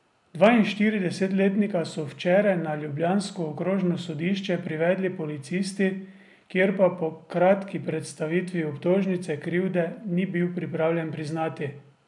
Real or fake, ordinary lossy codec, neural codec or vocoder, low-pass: real; none; none; 10.8 kHz